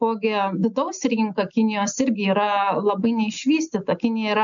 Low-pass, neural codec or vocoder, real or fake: 7.2 kHz; none; real